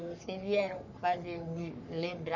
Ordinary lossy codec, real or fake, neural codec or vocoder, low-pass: none; fake; codec, 44.1 kHz, 3.4 kbps, Pupu-Codec; 7.2 kHz